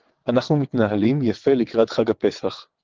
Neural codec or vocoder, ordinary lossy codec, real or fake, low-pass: vocoder, 22.05 kHz, 80 mel bands, WaveNeXt; Opus, 16 kbps; fake; 7.2 kHz